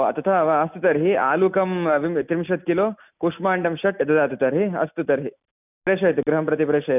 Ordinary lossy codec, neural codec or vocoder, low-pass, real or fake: none; none; 3.6 kHz; real